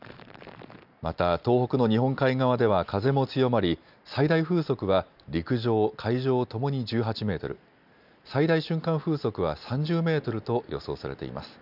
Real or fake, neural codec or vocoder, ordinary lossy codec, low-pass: real; none; none; 5.4 kHz